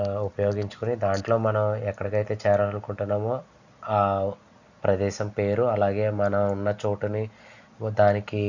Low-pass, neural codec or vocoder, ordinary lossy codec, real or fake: 7.2 kHz; none; none; real